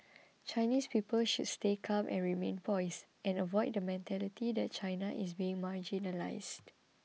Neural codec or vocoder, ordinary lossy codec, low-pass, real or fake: none; none; none; real